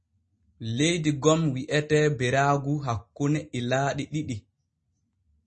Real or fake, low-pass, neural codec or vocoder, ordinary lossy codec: real; 10.8 kHz; none; MP3, 32 kbps